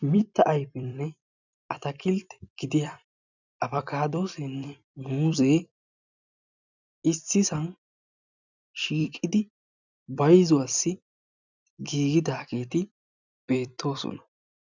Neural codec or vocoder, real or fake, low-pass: vocoder, 44.1 kHz, 128 mel bands every 256 samples, BigVGAN v2; fake; 7.2 kHz